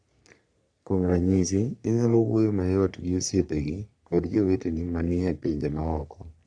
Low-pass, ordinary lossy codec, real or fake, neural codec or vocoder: 9.9 kHz; none; fake; codec, 44.1 kHz, 3.4 kbps, Pupu-Codec